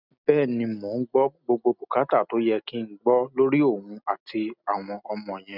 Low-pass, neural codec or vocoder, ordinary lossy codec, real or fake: 5.4 kHz; none; none; real